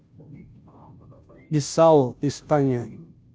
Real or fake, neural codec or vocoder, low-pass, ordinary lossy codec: fake; codec, 16 kHz, 0.5 kbps, FunCodec, trained on Chinese and English, 25 frames a second; none; none